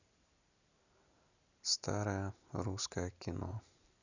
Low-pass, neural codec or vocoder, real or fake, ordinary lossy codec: 7.2 kHz; vocoder, 44.1 kHz, 128 mel bands every 256 samples, BigVGAN v2; fake; none